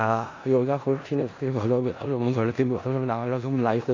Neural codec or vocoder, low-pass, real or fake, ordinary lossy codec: codec, 16 kHz in and 24 kHz out, 0.4 kbps, LongCat-Audio-Codec, four codebook decoder; 7.2 kHz; fake; AAC, 32 kbps